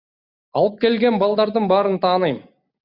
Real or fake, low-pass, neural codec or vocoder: real; 5.4 kHz; none